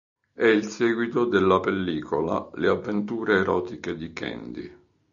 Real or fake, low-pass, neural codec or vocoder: real; 7.2 kHz; none